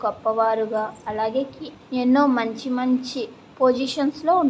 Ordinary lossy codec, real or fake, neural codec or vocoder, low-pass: none; real; none; none